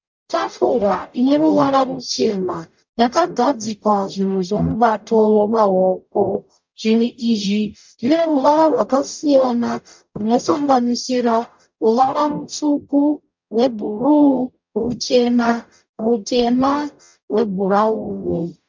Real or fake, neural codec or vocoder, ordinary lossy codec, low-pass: fake; codec, 44.1 kHz, 0.9 kbps, DAC; MP3, 64 kbps; 7.2 kHz